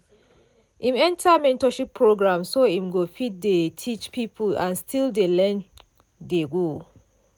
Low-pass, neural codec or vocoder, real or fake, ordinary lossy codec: none; none; real; none